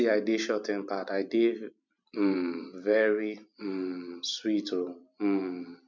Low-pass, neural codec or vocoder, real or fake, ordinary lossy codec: 7.2 kHz; vocoder, 44.1 kHz, 128 mel bands every 256 samples, BigVGAN v2; fake; none